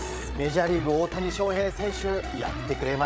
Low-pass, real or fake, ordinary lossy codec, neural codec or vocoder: none; fake; none; codec, 16 kHz, 8 kbps, FreqCodec, larger model